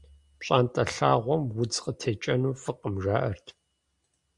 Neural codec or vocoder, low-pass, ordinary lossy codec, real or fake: none; 10.8 kHz; Opus, 64 kbps; real